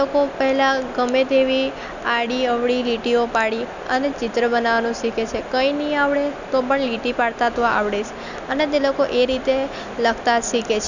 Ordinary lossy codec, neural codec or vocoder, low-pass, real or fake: none; none; 7.2 kHz; real